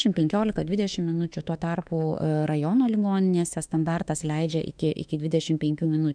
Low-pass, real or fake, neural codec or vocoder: 9.9 kHz; fake; autoencoder, 48 kHz, 32 numbers a frame, DAC-VAE, trained on Japanese speech